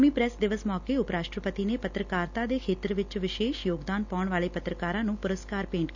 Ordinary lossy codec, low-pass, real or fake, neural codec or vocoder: none; 7.2 kHz; real; none